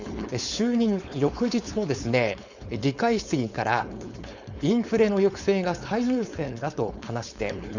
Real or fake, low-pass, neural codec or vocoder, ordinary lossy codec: fake; 7.2 kHz; codec, 16 kHz, 4.8 kbps, FACodec; Opus, 64 kbps